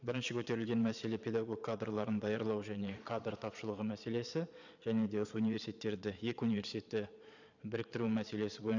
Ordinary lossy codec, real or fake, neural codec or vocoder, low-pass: none; fake; vocoder, 22.05 kHz, 80 mel bands, Vocos; 7.2 kHz